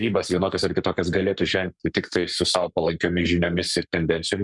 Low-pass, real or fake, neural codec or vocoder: 10.8 kHz; fake; codec, 44.1 kHz, 7.8 kbps, Pupu-Codec